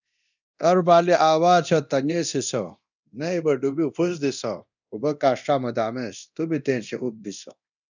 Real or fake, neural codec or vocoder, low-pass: fake; codec, 24 kHz, 0.9 kbps, DualCodec; 7.2 kHz